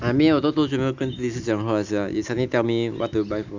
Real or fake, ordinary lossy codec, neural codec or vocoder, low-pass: real; Opus, 64 kbps; none; 7.2 kHz